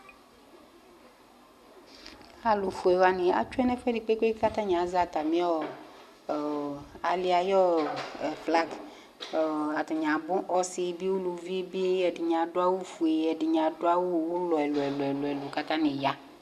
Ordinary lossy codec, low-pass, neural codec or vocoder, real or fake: AAC, 96 kbps; 14.4 kHz; none; real